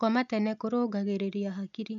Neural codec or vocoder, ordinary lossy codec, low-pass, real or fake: none; none; 7.2 kHz; real